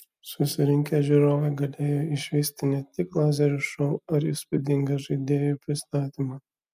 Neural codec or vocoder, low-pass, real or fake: none; 14.4 kHz; real